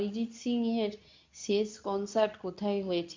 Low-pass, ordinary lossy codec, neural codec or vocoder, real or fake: 7.2 kHz; MP3, 64 kbps; codec, 24 kHz, 0.9 kbps, WavTokenizer, medium speech release version 2; fake